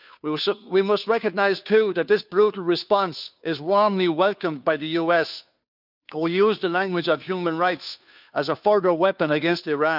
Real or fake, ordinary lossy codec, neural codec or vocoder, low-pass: fake; none; codec, 16 kHz, 2 kbps, FunCodec, trained on Chinese and English, 25 frames a second; 5.4 kHz